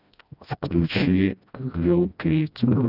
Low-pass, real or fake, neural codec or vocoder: 5.4 kHz; fake; codec, 16 kHz, 1 kbps, FreqCodec, smaller model